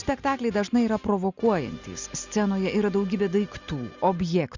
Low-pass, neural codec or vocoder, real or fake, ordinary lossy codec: 7.2 kHz; none; real; Opus, 64 kbps